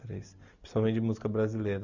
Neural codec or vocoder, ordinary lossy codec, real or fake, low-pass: none; none; real; 7.2 kHz